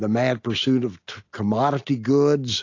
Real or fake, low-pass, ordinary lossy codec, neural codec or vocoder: real; 7.2 kHz; AAC, 48 kbps; none